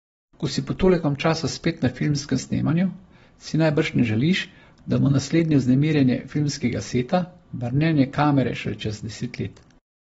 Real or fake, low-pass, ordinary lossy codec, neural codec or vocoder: real; 19.8 kHz; AAC, 24 kbps; none